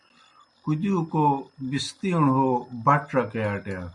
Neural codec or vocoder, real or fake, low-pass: none; real; 10.8 kHz